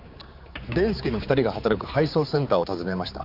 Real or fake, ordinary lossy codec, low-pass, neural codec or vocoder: fake; none; 5.4 kHz; codec, 16 kHz, 4 kbps, X-Codec, HuBERT features, trained on general audio